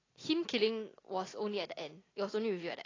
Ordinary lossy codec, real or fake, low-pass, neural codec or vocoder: AAC, 32 kbps; real; 7.2 kHz; none